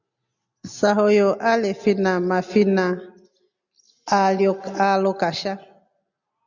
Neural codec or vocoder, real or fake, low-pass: none; real; 7.2 kHz